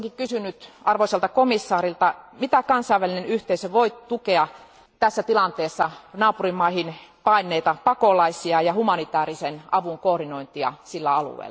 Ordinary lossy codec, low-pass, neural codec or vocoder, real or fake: none; none; none; real